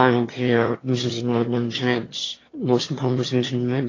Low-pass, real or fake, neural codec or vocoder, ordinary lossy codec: 7.2 kHz; fake; autoencoder, 22.05 kHz, a latent of 192 numbers a frame, VITS, trained on one speaker; AAC, 32 kbps